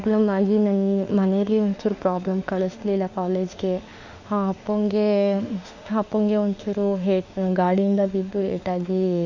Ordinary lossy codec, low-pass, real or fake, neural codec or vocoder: none; 7.2 kHz; fake; autoencoder, 48 kHz, 32 numbers a frame, DAC-VAE, trained on Japanese speech